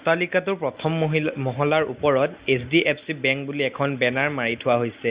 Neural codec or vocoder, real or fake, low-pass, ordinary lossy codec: none; real; 3.6 kHz; Opus, 64 kbps